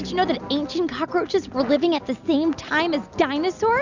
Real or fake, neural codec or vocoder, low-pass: real; none; 7.2 kHz